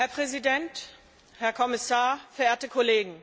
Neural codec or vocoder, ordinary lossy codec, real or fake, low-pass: none; none; real; none